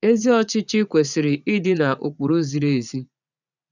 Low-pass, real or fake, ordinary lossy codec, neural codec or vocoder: 7.2 kHz; fake; none; vocoder, 44.1 kHz, 80 mel bands, Vocos